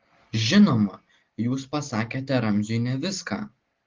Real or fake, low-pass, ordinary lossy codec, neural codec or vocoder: real; 7.2 kHz; Opus, 16 kbps; none